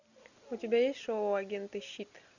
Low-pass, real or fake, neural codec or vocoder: 7.2 kHz; real; none